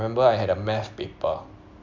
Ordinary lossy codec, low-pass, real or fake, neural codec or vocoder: MP3, 64 kbps; 7.2 kHz; real; none